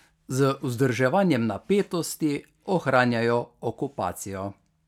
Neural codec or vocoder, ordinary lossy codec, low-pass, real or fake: none; none; 19.8 kHz; real